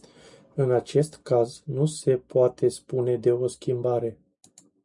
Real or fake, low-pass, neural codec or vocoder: real; 10.8 kHz; none